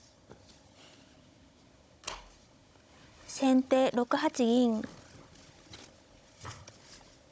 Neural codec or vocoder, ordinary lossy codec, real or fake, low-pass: codec, 16 kHz, 16 kbps, FunCodec, trained on Chinese and English, 50 frames a second; none; fake; none